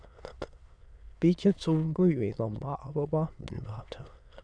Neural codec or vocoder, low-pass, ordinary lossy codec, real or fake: autoencoder, 22.05 kHz, a latent of 192 numbers a frame, VITS, trained on many speakers; 9.9 kHz; none; fake